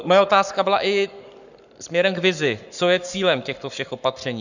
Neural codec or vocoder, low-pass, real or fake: codec, 44.1 kHz, 7.8 kbps, Pupu-Codec; 7.2 kHz; fake